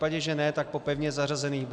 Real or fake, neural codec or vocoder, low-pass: real; none; 9.9 kHz